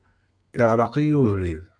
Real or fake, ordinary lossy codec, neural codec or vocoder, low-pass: fake; MP3, 96 kbps; codec, 32 kHz, 1.9 kbps, SNAC; 9.9 kHz